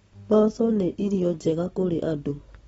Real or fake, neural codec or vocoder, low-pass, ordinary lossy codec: real; none; 19.8 kHz; AAC, 24 kbps